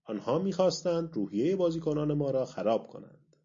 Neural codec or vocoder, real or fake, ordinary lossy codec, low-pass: none; real; MP3, 48 kbps; 7.2 kHz